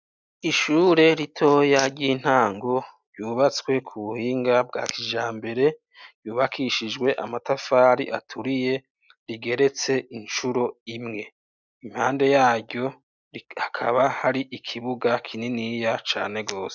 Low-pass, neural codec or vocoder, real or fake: 7.2 kHz; none; real